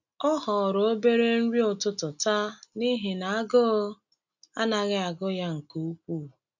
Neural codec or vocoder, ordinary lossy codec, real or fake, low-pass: none; none; real; 7.2 kHz